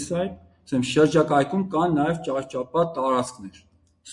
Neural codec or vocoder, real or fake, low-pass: none; real; 10.8 kHz